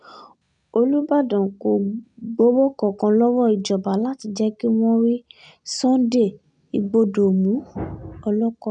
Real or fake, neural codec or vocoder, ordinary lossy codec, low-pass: real; none; none; 9.9 kHz